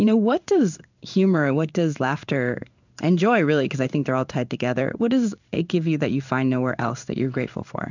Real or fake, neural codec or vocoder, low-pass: fake; codec, 16 kHz in and 24 kHz out, 1 kbps, XY-Tokenizer; 7.2 kHz